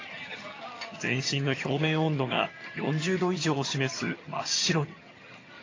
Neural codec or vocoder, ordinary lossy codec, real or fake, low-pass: vocoder, 22.05 kHz, 80 mel bands, HiFi-GAN; AAC, 32 kbps; fake; 7.2 kHz